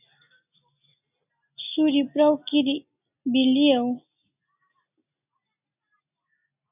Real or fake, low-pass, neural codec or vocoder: real; 3.6 kHz; none